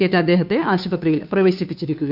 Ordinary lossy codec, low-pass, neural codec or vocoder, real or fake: none; 5.4 kHz; codec, 16 kHz, 2 kbps, FunCodec, trained on Chinese and English, 25 frames a second; fake